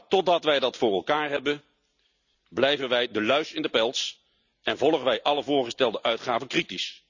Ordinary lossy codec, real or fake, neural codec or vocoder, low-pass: none; real; none; 7.2 kHz